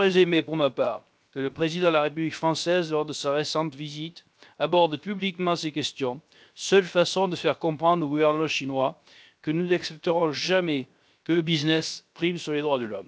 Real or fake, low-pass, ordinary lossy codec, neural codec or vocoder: fake; none; none; codec, 16 kHz, 0.7 kbps, FocalCodec